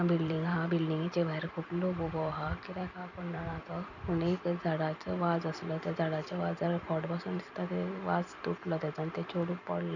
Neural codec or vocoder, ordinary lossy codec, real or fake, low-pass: vocoder, 44.1 kHz, 128 mel bands every 256 samples, BigVGAN v2; none; fake; 7.2 kHz